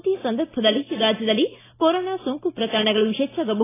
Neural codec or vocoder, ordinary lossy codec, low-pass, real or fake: none; AAC, 16 kbps; 3.6 kHz; real